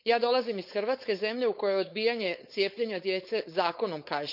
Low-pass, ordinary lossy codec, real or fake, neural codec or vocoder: 5.4 kHz; none; fake; codec, 16 kHz, 4.8 kbps, FACodec